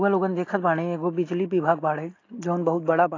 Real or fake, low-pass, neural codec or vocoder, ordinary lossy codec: real; 7.2 kHz; none; AAC, 32 kbps